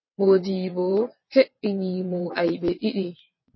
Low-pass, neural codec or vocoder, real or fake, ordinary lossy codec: 7.2 kHz; vocoder, 22.05 kHz, 80 mel bands, WaveNeXt; fake; MP3, 24 kbps